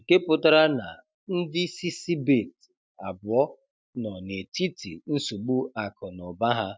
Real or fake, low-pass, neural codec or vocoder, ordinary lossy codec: real; none; none; none